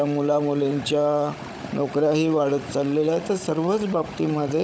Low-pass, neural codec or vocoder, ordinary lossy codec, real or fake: none; codec, 16 kHz, 16 kbps, FunCodec, trained on Chinese and English, 50 frames a second; none; fake